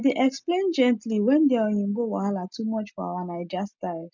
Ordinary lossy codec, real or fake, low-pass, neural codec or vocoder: none; real; 7.2 kHz; none